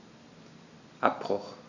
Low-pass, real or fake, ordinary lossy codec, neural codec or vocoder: 7.2 kHz; real; none; none